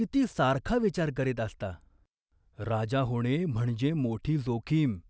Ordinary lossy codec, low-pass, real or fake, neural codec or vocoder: none; none; real; none